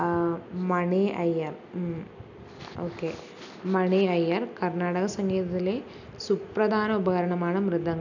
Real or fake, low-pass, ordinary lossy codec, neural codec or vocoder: real; 7.2 kHz; none; none